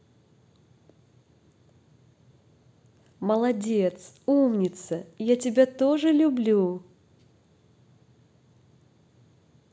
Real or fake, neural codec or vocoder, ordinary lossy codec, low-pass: real; none; none; none